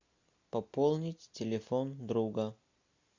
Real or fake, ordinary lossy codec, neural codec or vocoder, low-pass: real; MP3, 64 kbps; none; 7.2 kHz